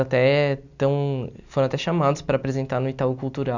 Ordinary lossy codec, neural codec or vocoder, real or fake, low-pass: none; none; real; 7.2 kHz